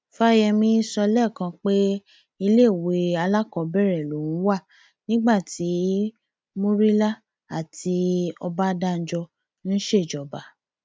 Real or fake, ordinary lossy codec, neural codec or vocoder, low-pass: real; none; none; none